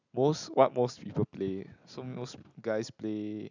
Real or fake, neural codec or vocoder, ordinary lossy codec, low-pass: real; none; none; 7.2 kHz